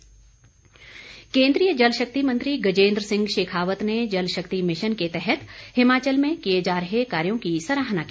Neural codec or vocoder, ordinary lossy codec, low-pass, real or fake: none; none; none; real